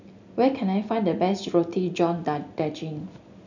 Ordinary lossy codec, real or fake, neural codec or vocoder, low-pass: none; real; none; 7.2 kHz